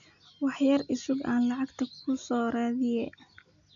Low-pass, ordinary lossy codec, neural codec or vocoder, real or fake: 7.2 kHz; none; none; real